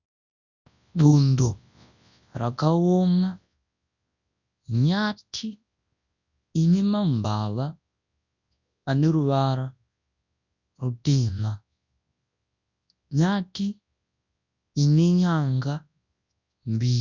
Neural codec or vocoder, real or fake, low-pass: codec, 24 kHz, 0.9 kbps, WavTokenizer, large speech release; fake; 7.2 kHz